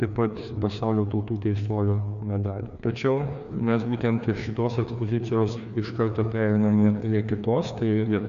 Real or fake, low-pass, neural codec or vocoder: fake; 7.2 kHz; codec, 16 kHz, 2 kbps, FreqCodec, larger model